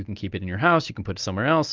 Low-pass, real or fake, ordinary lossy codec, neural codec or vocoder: 7.2 kHz; real; Opus, 32 kbps; none